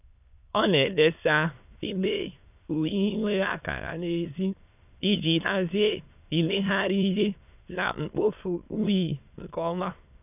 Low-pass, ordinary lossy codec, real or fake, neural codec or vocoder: 3.6 kHz; none; fake; autoencoder, 22.05 kHz, a latent of 192 numbers a frame, VITS, trained on many speakers